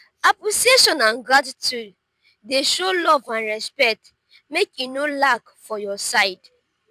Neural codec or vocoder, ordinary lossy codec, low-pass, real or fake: vocoder, 44.1 kHz, 128 mel bands every 256 samples, BigVGAN v2; none; 14.4 kHz; fake